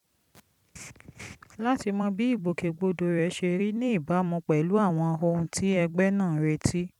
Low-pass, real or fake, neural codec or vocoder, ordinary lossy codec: 19.8 kHz; fake; vocoder, 44.1 kHz, 128 mel bands every 256 samples, BigVGAN v2; none